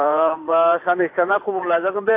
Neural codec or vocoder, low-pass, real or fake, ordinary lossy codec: vocoder, 44.1 kHz, 128 mel bands every 512 samples, BigVGAN v2; 3.6 kHz; fake; AAC, 24 kbps